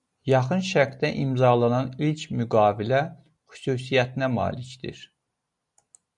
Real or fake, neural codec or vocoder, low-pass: real; none; 10.8 kHz